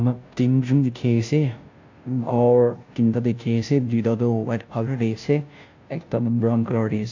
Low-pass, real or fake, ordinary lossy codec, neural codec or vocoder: 7.2 kHz; fake; AAC, 48 kbps; codec, 16 kHz, 0.5 kbps, FunCodec, trained on Chinese and English, 25 frames a second